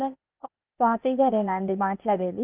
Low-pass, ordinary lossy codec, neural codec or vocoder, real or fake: 3.6 kHz; Opus, 24 kbps; codec, 16 kHz, 0.8 kbps, ZipCodec; fake